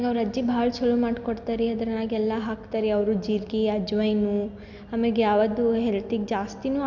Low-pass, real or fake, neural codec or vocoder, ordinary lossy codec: 7.2 kHz; real; none; none